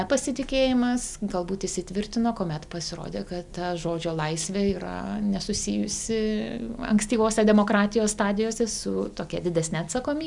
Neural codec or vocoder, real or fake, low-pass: none; real; 10.8 kHz